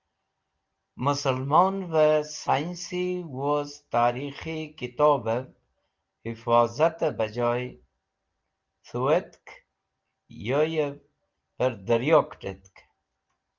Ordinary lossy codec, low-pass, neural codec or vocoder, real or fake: Opus, 32 kbps; 7.2 kHz; none; real